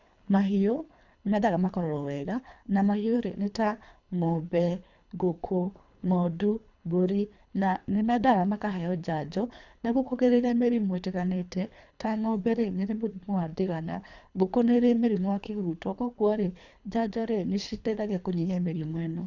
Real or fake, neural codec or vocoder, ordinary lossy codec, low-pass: fake; codec, 24 kHz, 3 kbps, HILCodec; none; 7.2 kHz